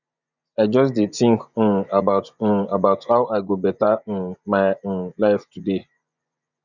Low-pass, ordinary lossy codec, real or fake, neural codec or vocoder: 7.2 kHz; none; fake; vocoder, 24 kHz, 100 mel bands, Vocos